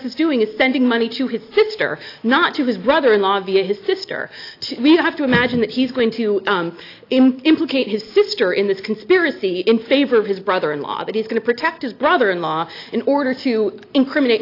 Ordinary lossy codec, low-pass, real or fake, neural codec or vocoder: AAC, 32 kbps; 5.4 kHz; real; none